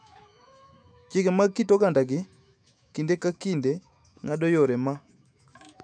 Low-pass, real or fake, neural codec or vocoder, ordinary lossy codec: 9.9 kHz; fake; autoencoder, 48 kHz, 128 numbers a frame, DAC-VAE, trained on Japanese speech; none